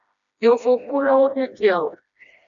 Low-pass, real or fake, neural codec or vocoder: 7.2 kHz; fake; codec, 16 kHz, 1 kbps, FreqCodec, smaller model